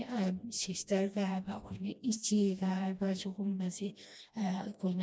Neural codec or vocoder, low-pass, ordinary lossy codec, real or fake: codec, 16 kHz, 1 kbps, FreqCodec, smaller model; none; none; fake